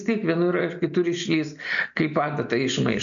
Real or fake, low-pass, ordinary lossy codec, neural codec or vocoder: real; 7.2 kHz; MP3, 96 kbps; none